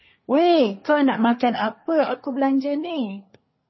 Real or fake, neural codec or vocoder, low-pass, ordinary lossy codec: fake; codec, 24 kHz, 1 kbps, SNAC; 7.2 kHz; MP3, 24 kbps